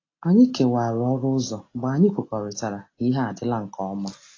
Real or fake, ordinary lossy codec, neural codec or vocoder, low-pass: real; AAC, 32 kbps; none; 7.2 kHz